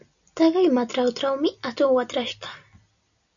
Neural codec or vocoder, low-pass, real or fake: none; 7.2 kHz; real